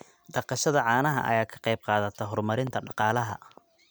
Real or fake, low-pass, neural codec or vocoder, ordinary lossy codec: real; none; none; none